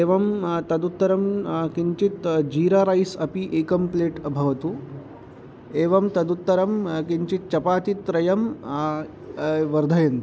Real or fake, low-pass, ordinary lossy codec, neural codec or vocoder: real; none; none; none